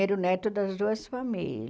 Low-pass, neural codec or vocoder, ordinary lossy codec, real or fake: none; none; none; real